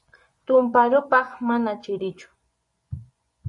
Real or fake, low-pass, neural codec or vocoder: fake; 10.8 kHz; vocoder, 24 kHz, 100 mel bands, Vocos